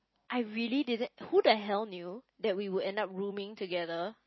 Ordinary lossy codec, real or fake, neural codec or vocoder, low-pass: MP3, 24 kbps; real; none; 7.2 kHz